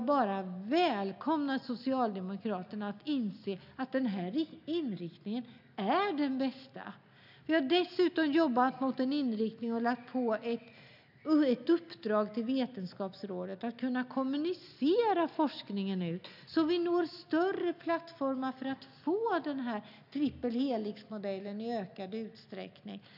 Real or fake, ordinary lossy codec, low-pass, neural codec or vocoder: real; none; 5.4 kHz; none